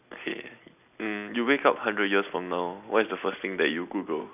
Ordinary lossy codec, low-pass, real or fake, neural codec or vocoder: none; 3.6 kHz; real; none